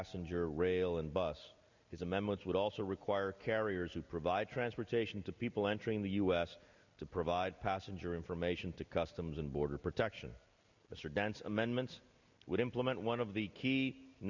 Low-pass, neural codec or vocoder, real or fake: 7.2 kHz; none; real